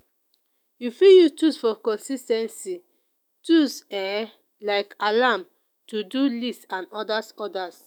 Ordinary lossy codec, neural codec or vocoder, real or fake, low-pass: none; autoencoder, 48 kHz, 128 numbers a frame, DAC-VAE, trained on Japanese speech; fake; 19.8 kHz